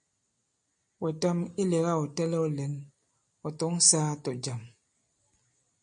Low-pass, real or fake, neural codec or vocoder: 9.9 kHz; real; none